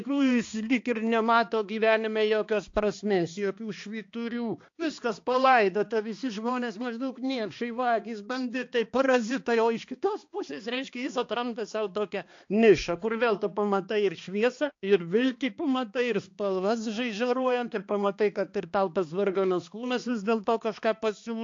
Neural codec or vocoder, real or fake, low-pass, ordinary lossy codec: codec, 16 kHz, 2 kbps, X-Codec, HuBERT features, trained on balanced general audio; fake; 7.2 kHz; AAC, 48 kbps